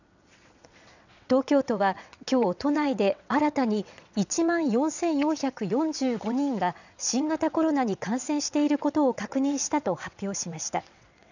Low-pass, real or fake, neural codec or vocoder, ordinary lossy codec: 7.2 kHz; fake; vocoder, 22.05 kHz, 80 mel bands, WaveNeXt; none